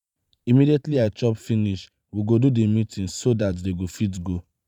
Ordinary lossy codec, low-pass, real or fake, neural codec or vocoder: none; 19.8 kHz; fake; vocoder, 44.1 kHz, 128 mel bands every 512 samples, BigVGAN v2